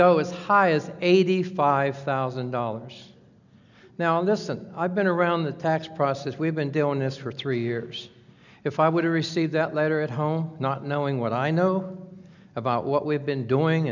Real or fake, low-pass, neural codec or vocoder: real; 7.2 kHz; none